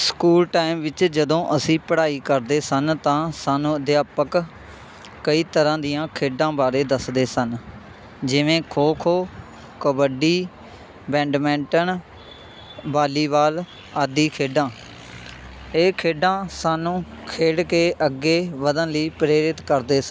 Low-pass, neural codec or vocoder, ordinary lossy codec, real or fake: none; none; none; real